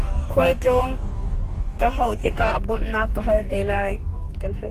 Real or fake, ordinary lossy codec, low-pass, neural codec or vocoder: fake; Opus, 32 kbps; 14.4 kHz; codec, 44.1 kHz, 2.6 kbps, DAC